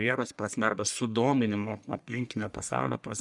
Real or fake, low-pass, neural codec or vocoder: fake; 10.8 kHz; codec, 44.1 kHz, 1.7 kbps, Pupu-Codec